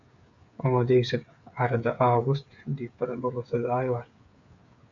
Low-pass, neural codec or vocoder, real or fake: 7.2 kHz; codec, 16 kHz, 8 kbps, FreqCodec, smaller model; fake